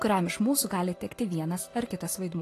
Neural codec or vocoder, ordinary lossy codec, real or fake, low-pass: none; AAC, 48 kbps; real; 14.4 kHz